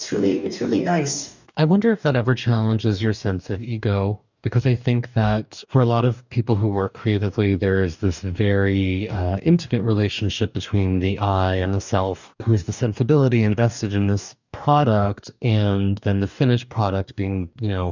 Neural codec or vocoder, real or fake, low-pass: codec, 44.1 kHz, 2.6 kbps, DAC; fake; 7.2 kHz